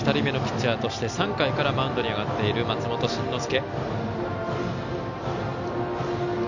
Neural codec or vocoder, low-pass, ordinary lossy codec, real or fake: none; 7.2 kHz; none; real